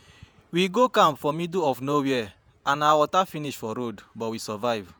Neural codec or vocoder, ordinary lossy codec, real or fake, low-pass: vocoder, 48 kHz, 128 mel bands, Vocos; none; fake; none